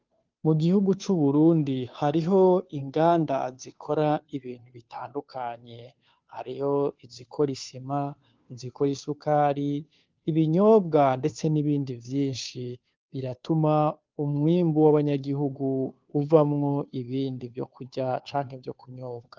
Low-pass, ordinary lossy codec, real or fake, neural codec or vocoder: 7.2 kHz; Opus, 32 kbps; fake; codec, 16 kHz, 2 kbps, FunCodec, trained on Chinese and English, 25 frames a second